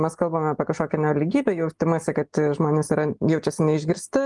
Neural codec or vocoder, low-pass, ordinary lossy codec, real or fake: none; 10.8 kHz; Opus, 32 kbps; real